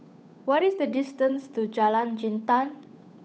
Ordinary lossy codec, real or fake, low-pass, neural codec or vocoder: none; fake; none; codec, 16 kHz, 8 kbps, FunCodec, trained on Chinese and English, 25 frames a second